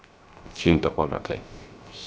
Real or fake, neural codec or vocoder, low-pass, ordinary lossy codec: fake; codec, 16 kHz, 0.3 kbps, FocalCodec; none; none